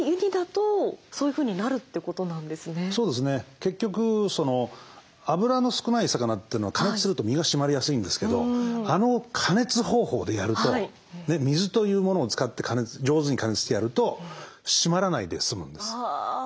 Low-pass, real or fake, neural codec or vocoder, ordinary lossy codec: none; real; none; none